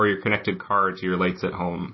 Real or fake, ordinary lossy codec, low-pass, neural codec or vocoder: real; MP3, 24 kbps; 7.2 kHz; none